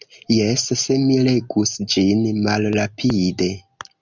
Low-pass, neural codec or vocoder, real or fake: 7.2 kHz; none; real